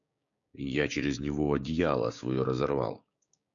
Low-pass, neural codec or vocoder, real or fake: 7.2 kHz; codec, 16 kHz, 6 kbps, DAC; fake